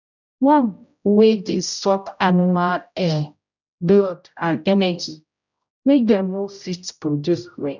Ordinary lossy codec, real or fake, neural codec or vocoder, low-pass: none; fake; codec, 16 kHz, 0.5 kbps, X-Codec, HuBERT features, trained on general audio; 7.2 kHz